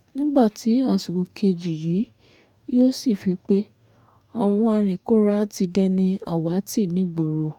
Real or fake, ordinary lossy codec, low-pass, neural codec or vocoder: fake; none; 19.8 kHz; codec, 44.1 kHz, 2.6 kbps, DAC